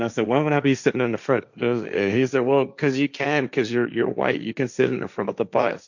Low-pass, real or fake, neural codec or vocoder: 7.2 kHz; fake; codec, 16 kHz, 1.1 kbps, Voila-Tokenizer